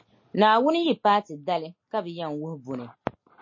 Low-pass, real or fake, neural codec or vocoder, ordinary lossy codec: 7.2 kHz; real; none; MP3, 32 kbps